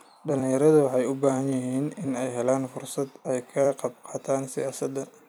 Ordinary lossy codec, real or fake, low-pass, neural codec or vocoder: none; fake; none; vocoder, 44.1 kHz, 128 mel bands every 256 samples, BigVGAN v2